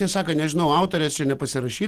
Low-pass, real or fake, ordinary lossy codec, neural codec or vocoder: 14.4 kHz; real; Opus, 16 kbps; none